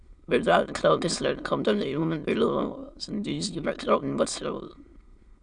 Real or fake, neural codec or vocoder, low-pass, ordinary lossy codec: fake; autoencoder, 22.05 kHz, a latent of 192 numbers a frame, VITS, trained on many speakers; 9.9 kHz; Opus, 64 kbps